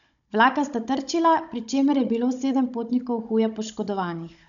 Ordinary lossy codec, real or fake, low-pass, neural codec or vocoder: none; fake; 7.2 kHz; codec, 16 kHz, 16 kbps, FunCodec, trained on Chinese and English, 50 frames a second